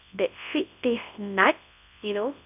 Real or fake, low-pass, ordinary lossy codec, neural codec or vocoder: fake; 3.6 kHz; none; codec, 24 kHz, 0.9 kbps, WavTokenizer, large speech release